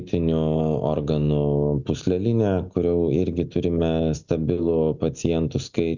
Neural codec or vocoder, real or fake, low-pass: none; real; 7.2 kHz